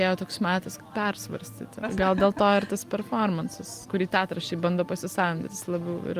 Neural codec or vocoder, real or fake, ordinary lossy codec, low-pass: none; real; Opus, 32 kbps; 14.4 kHz